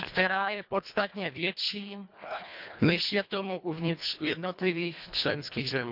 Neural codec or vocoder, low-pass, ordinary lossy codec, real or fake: codec, 24 kHz, 1.5 kbps, HILCodec; 5.4 kHz; none; fake